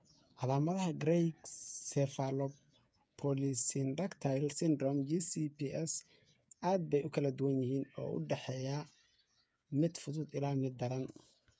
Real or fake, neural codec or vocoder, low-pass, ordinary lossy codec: fake; codec, 16 kHz, 8 kbps, FreqCodec, smaller model; none; none